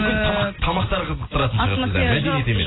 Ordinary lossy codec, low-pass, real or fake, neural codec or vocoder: AAC, 16 kbps; 7.2 kHz; real; none